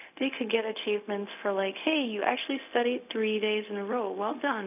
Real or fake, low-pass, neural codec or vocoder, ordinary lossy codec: fake; 3.6 kHz; codec, 16 kHz, 0.4 kbps, LongCat-Audio-Codec; none